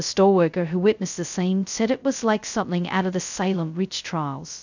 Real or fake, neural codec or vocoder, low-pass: fake; codec, 16 kHz, 0.2 kbps, FocalCodec; 7.2 kHz